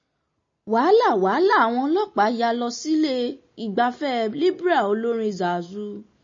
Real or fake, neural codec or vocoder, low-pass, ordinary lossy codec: real; none; 7.2 kHz; MP3, 32 kbps